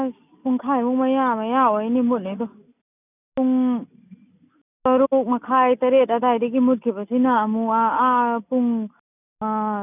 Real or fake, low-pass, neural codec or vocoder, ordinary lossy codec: real; 3.6 kHz; none; none